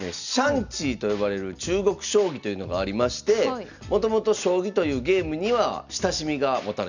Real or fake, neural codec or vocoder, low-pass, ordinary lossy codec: real; none; 7.2 kHz; none